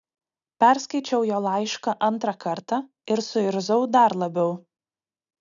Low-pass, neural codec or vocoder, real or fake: 7.2 kHz; none; real